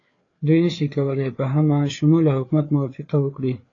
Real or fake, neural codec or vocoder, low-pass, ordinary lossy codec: fake; codec, 16 kHz, 4 kbps, FreqCodec, larger model; 7.2 kHz; AAC, 32 kbps